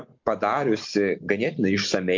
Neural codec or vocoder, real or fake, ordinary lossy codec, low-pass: none; real; MP3, 48 kbps; 7.2 kHz